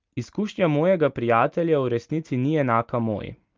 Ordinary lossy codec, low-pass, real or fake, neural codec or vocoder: Opus, 24 kbps; 7.2 kHz; real; none